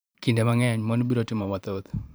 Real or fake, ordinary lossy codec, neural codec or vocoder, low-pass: fake; none; vocoder, 44.1 kHz, 128 mel bands every 512 samples, BigVGAN v2; none